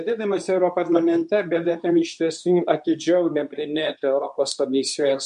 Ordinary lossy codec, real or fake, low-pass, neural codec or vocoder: MP3, 64 kbps; fake; 10.8 kHz; codec, 24 kHz, 0.9 kbps, WavTokenizer, medium speech release version 2